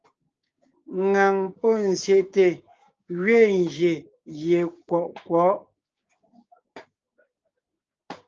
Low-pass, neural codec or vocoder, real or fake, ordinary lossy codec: 7.2 kHz; codec, 16 kHz, 6 kbps, DAC; fake; Opus, 24 kbps